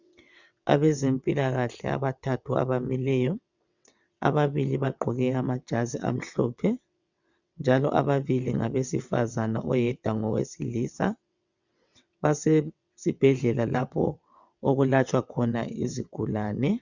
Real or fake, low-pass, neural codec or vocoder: fake; 7.2 kHz; vocoder, 22.05 kHz, 80 mel bands, WaveNeXt